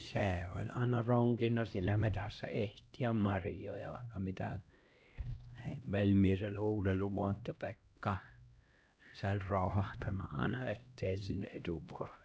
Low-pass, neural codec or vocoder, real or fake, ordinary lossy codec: none; codec, 16 kHz, 1 kbps, X-Codec, HuBERT features, trained on LibriSpeech; fake; none